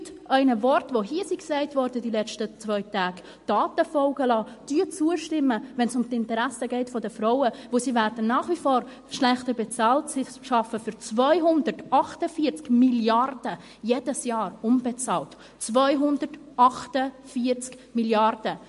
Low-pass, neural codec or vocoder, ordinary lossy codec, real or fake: 14.4 kHz; none; MP3, 48 kbps; real